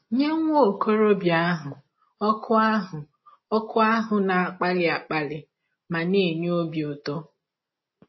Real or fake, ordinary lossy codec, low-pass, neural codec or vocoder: real; MP3, 24 kbps; 7.2 kHz; none